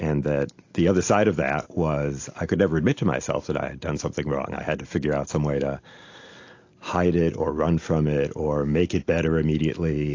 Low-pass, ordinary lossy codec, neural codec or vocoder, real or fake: 7.2 kHz; AAC, 48 kbps; none; real